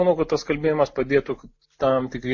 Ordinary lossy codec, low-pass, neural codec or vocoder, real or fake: MP3, 32 kbps; 7.2 kHz; none; real